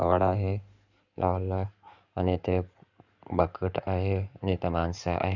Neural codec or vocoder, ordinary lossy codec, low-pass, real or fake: codec, 16 kHz in and 24 kHz out, 2.2 kbps, FireRedTTS-2 codec; none; 7.2 kHz; fake